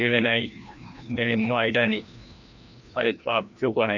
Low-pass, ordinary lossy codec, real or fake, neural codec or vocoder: 7.2 kHz; none; fake; codec, 16 kHz, 1 kbps, FreqCodec, larger model